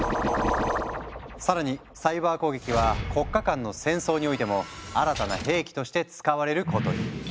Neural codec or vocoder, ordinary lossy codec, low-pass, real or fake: none; none; none; real